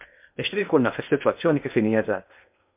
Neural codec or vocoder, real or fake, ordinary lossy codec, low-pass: codec, 16 kHz in and 24 kHz out, 0.6 kbps, FocalCodec, streaming, 2048 codes; fake; MP3, 32 kbps; 3.6 kHz